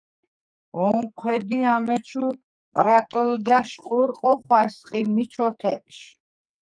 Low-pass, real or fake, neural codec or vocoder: 9.9 kHz; fake; codec, 44.1 kHz, 2.6 kbps, SNAC